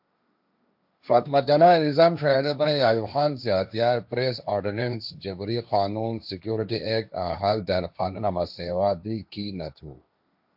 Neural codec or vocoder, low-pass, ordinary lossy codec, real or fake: codec, 16 kHz, 1.1 kbps, Voila-Tokenizer; 5.4 kHz; AAC, 48 kbps; fake